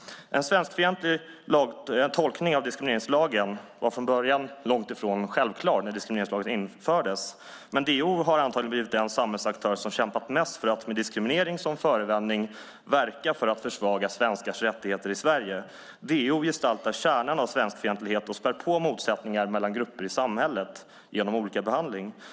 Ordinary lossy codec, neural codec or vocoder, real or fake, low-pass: none; none; real; none